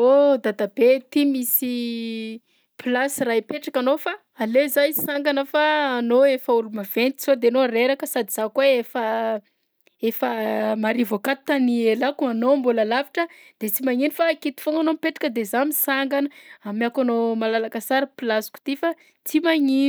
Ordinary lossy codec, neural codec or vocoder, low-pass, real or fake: none; none; none; real